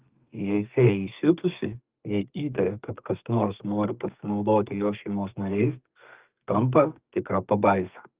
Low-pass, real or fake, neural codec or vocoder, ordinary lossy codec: 3.6 kHz; fake; codec, 32 kHz, 1.9 kbps, SNAC; Opus, 32 kbps